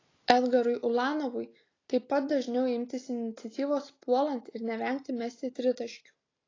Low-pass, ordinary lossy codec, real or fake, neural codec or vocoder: 7.2 kHz; AAC, 32 kbps; real; none